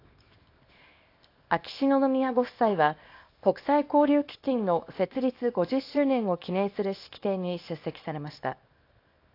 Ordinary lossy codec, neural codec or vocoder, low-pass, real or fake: AAC, 32 kbps; codec, 24 kHz, 0.9 kbps, WavTokenizer, small release; 5.4 kHz; fake